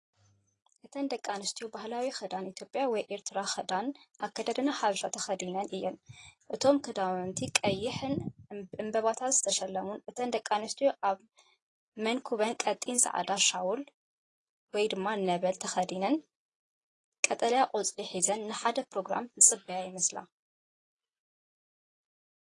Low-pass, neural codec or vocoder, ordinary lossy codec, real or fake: 10.8 kHz; none; AAC, 32 kbps; real